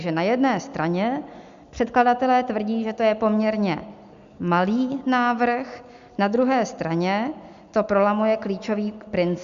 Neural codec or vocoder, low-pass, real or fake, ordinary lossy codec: none; 7.2 kHz; real; Opus, 64 kbps